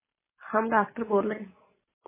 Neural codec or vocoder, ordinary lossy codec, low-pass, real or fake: none; MP3, 16 kbps; 3.6 kHz; real